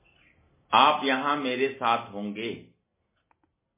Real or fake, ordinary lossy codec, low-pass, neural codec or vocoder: real; MP3, 16 kbps; 3.6 kHz; none